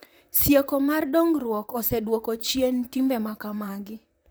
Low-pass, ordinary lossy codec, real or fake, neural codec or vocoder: none; none; fake; vocoder, 44.1 kHz, 128 mel bands, Pupu-Vocoder